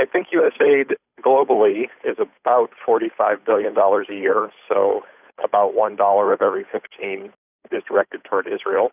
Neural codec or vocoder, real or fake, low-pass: codec, 16 kHz, 8 kbps, FunCodec, trained on Chinese and English, 25 frames a second; fake; 3.6 kHz